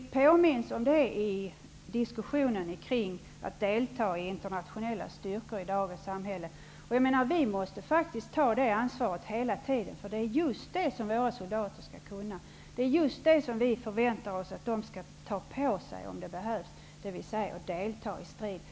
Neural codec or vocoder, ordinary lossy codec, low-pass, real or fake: none; none; none; real